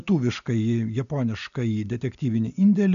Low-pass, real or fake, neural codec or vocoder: 7.2 kHz; real; none